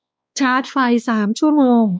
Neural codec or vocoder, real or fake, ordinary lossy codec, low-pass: codec, 16 kHz, 2 kbps, X-Codec, WavLM features, trained on Multilingual LibriSpeech; fake; none; none